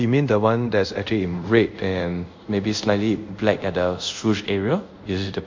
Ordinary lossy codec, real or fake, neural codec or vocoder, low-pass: MP3, 48 kbps; fake; codec, 24 kHz, 0.5 kbps, DualCodec; 7.2 kHz